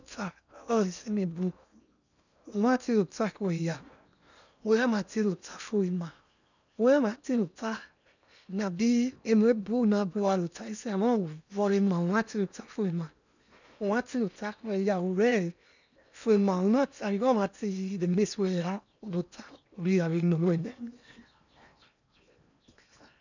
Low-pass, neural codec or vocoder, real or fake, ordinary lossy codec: 7.2 kHz; codec, 16 kHz in and 24 kHz out, 0.8 kbps, FocalCodec, streaming, 65536 codes; fake; none